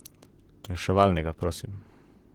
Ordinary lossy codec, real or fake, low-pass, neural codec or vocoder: Opus, 16 kbps; fake; 19.8 kHz; vocoder, 44.1 kHz, 128 mel bands, Pupu-Vocoder